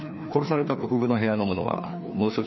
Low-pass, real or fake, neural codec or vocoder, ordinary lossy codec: 7.2 kHz; fake; codec, 16 kHz, 2 kbps, FreqCodec, larger model; MP3, 24 kbps